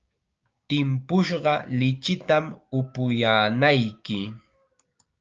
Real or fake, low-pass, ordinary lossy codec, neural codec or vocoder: fake; 7.2 kHz; Opus, 24 kbps; codec, 16 kHz, 6 kbps, DAC